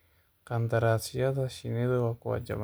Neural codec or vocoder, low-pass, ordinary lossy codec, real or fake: none; none; none; real